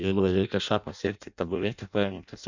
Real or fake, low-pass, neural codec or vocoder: fake; 7.2 kHz; codec, 16 kHz in and 24 kHz out, 0.6 kbps, FireRedTTS-2 codec